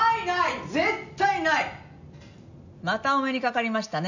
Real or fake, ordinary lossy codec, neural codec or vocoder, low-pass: real; none; none; 7.2 kHz